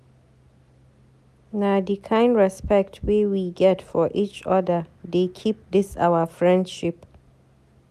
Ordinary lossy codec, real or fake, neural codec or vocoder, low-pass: none; real; none; 14.4 kHz